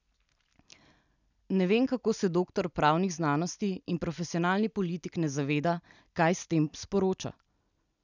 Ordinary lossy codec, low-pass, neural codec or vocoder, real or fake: none; 7.2 kHz; none; real